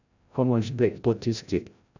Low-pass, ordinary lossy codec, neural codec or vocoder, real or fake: 7.2 kHz; none; codec, 16 kHz, 0.5 kbps, FreqCodec, larger model; fake